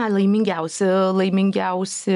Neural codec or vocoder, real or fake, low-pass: none; real; 10.8 kHz